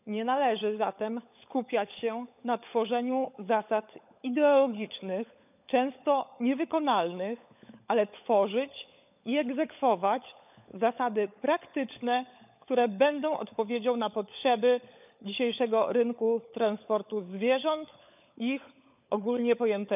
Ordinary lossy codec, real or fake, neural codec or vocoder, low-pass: none; fake; codec, 16 kHz, 16 kbps, FunCodec, trained on LibriTTS, 50 frames a second; 3.6 kHz